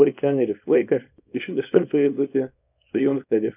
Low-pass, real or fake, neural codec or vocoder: 3.6 kHz; fake; codec, 24 kHz, 0.9 kbps, WavTokenizer, small release